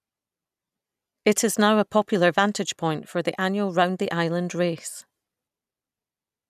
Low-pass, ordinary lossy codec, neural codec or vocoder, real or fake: 14.4 kHz; none; none; real